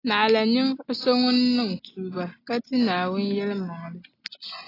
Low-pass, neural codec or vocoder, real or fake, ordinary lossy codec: 5.4 kHz; none; real; AAC, 24 kbps